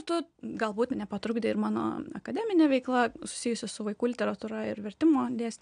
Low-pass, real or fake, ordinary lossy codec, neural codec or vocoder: 9.9 kHz; real; AAC, 96 kbps; none